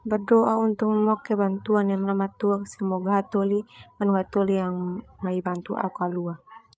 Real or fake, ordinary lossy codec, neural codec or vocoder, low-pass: fake; none; codec, 16 kHz, 8 kbps, FreqCodec, larger model; none